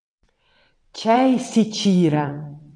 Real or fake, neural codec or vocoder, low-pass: fake; vocoder, 22.05 kHz, 80 mel bands, WaveNeXt; 9.9 kHz